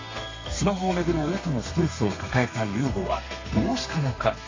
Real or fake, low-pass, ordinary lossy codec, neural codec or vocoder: fake; 7.2 kHz; AAC, 32 kbps; codec, 32 kHz, 1.9 kbps, SNAC